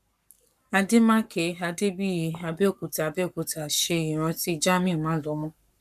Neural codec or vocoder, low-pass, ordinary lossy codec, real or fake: codec, 44.1 kHz, 7.8 kbps, Pupu-Codec; 14.4 kHz; none; fake